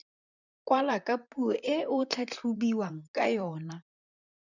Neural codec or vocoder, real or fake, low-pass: vocoder, 44.1 kHz, 128 mel bands, Pupu-Vocoder; fake; 7.2 kHz